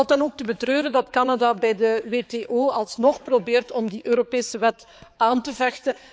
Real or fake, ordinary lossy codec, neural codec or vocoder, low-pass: fake; none; codec, 16 kHz, 4 kbps, X-Codec, HuBERT features, trained on balanced general audio; none